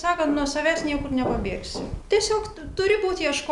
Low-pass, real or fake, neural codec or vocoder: 10.8 kHz; real; none